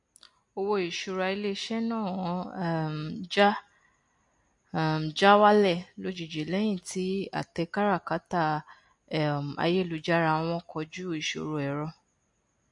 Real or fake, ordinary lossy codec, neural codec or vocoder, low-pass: real; MP3, 48 kbps; none; 10.8 kHz